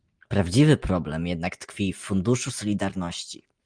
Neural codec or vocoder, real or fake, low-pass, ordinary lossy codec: none; real; 9.9 kHz; Opus, 32 kbps